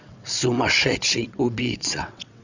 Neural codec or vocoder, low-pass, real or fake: vocoder, 22.05 kHz, 80 mel bands, WaveNeXt; 7.2 kHz; fake